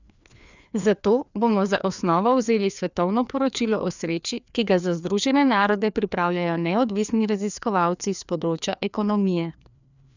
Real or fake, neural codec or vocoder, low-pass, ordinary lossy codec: fake; codec, 16 kHz, 2 kbps, FreqCodec, larger model; 7.2 kHz; none